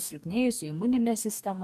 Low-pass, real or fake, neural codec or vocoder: 14.4 kHz; fake; codec, 44.1 kHz, 2.6 kbps, DAC